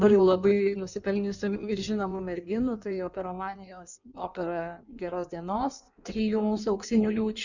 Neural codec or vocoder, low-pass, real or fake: codec, 16 kHz in and 24 kHz out, 1.1 kbps, FireRedTTS-2 codec; 7.2 kHz; fake